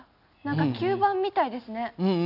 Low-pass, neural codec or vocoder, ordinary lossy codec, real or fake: 5.4 kHz; none; none; real